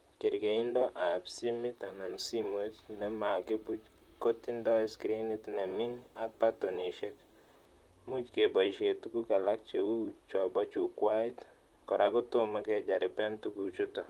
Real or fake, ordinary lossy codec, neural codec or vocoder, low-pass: fake; Opus, 32 kbps; vocoder, 44.1 kHz, 128 mel bands, Pupu-Vocoder; 19.8 kHz